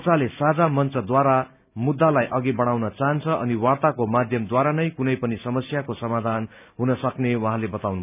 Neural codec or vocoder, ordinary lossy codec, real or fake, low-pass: none; none; real; 3.6 kHz